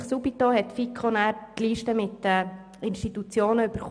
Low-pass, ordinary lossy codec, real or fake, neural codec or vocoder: 9.9 kHz; none; real; none